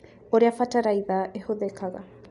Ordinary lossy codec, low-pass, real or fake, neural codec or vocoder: none; 10.8 kHz; real; none